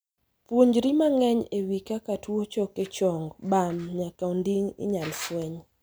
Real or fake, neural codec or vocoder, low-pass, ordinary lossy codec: real; none; none; none